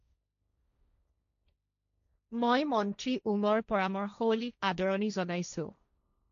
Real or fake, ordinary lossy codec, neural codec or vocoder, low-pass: fake; none; codec, 16 kHz, 1.1 kbps, Voila-Tokenizer; 7.2 kHz